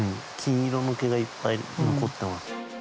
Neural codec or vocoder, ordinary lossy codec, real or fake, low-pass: none; none; real; none